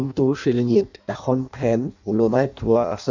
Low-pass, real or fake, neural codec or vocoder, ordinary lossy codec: 7.2 kHz; fake; codec, 16 kHz in and 24 kHz out, 0.6 kbps, FireRedTTS-2 codec; none